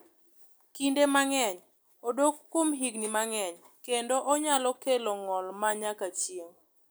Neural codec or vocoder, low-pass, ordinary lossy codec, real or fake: none; none; none; real